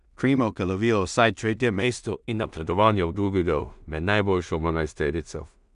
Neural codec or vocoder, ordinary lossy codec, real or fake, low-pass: codec, 16 kHz in and 24 kHz out, 0.4 kbps, LongCat-Audio-Codec, two codebook decoder; none; fake; 10.8 kHz